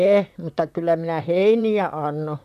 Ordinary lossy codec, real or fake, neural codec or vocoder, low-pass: none; fake; vocoder, 44.1 kHz, 128 mel bands, Pupu-Vocoder; 14.4 kHz